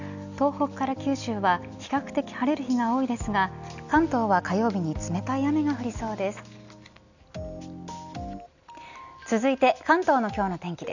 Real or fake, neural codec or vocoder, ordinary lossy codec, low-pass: real; none; none; 7.2 kHz